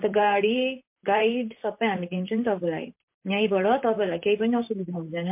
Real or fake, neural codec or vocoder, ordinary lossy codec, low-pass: fake; vocoder, 44.1 kHz, 128 mel bands, Pupu-Vocoder; MP3, 32 kbps; 3.6 kHz